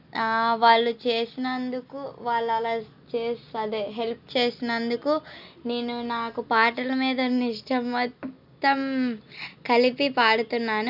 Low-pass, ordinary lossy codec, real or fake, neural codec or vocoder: 5.4 kHz; none; real; none